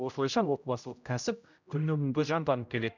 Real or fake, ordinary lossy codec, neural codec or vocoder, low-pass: fake; none; codec, 16 kHz, 0.5 kbps, X-Codec, HuBERT features, trained on general audio; 7.2 kHz